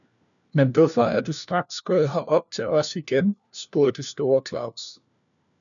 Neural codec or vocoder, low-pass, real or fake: codec, 16 kHz, 1 kbps, FunCodec, trained on LibriTTS, 50 frames a second; 7.2 kHz; fake